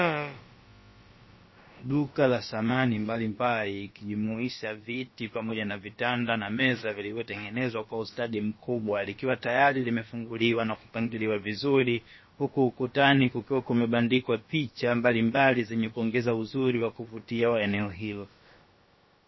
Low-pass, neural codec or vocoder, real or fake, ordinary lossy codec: 7.2 kHz; codec, 16 kHz, about 1 kbps, DyCAST, with the encoder's durations; fake; MP3, 24 kbps